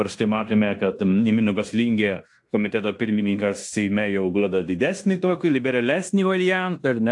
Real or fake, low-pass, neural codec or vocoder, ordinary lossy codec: fake; 10.8 kHz; codec, 16 kHz in and 24 kHz out, 0.9 kbps, LongCat-Audio-Codec, fine tuned four codebook decoder; AAC, 64 kbps